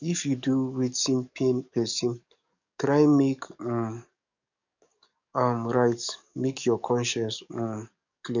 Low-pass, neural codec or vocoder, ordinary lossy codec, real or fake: 7.2 kHz; codec, 44.1 kHz, 7.8 kbps, DAC; none; fake